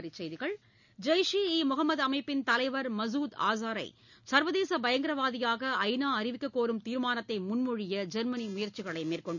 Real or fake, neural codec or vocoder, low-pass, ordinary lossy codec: real; none; 7.2 kHz; none